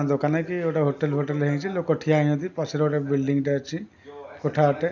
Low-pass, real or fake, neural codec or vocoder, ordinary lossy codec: 7.2 kHz; real; none; none